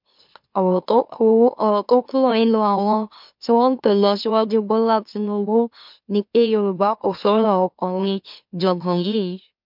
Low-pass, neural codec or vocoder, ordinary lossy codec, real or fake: 5.4 kHz; autoencoder, 44.1 kHz, a latent of 192 numbers a frame, MeloTTS; MP3, 48 kbps; fake